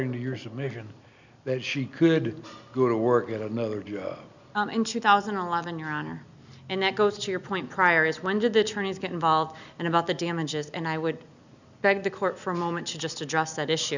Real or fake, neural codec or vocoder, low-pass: real; none; 7.2 kHz